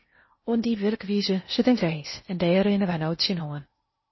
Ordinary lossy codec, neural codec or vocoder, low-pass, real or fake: MP3, 24 kbps; codec, 16 kHz in and 24 kHz out, 0.6 kbps, FocalCodec, streaming, 2048 codes; 7.2 kHz; fake